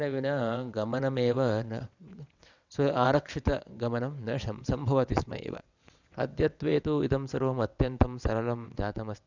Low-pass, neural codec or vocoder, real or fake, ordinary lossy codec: 7.2 kHz; vocoder, 22.05 kHz, 80 mel bands, WaveNeXt; fake; Opus, 64 kbps